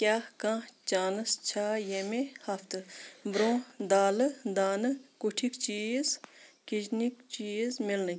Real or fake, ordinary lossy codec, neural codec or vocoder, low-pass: real; none; none; none